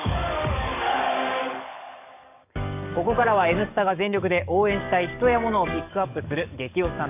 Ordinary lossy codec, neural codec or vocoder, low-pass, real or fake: none; codec, 44.1 kHz, 7.8 kbps, DAC; 3.6 kHz; fake